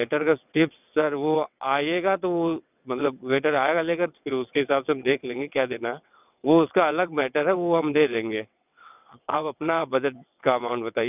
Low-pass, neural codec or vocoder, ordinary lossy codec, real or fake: 3.6 kHz; vocoder, 22.05 kHz, 80 mel bands, WaveNeXt; none; fake